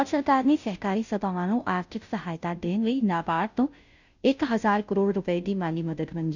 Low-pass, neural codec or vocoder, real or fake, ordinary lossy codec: 7.2 kHz; codec, 16 kHz, 0.5 kbps, FunCodec, trained on Chinese and English, 25 frames a second; fake; none